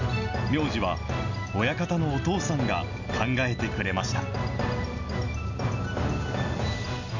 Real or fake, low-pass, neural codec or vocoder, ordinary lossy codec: real; 7.2 kHz; none; none